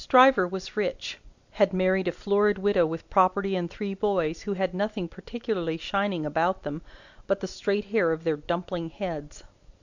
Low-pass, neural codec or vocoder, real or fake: 7.2 kHz; none; real